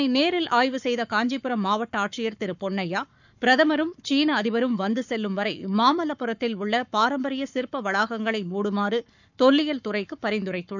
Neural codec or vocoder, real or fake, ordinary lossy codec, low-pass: codec, 44.1 kHz, 7.8 kbps, Pupu-Codec; fake; none; 7.2 kHz